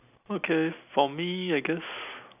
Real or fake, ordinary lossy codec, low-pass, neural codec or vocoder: real; none; 3.6 kHz; none